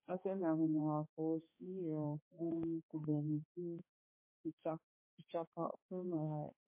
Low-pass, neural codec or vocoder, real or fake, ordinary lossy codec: 3.6 kHz; codec, 16 kHz, 2 kbps, X-Codec, HuBERT features, trained on balanced general audio; fake; MP3, 24 kbps